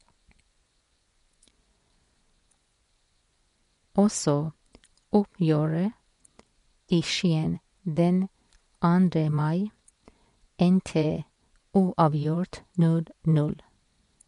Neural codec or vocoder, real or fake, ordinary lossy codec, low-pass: vocoder, 24 kHz, 100 mel bands, Vocos; fake; MP3, 64 kbps; 10.8 kHz